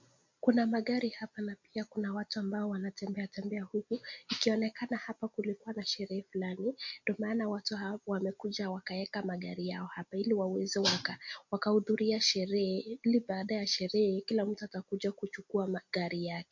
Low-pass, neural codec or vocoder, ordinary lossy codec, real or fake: 7.2 kHz; none; MP3, 48 kbps; real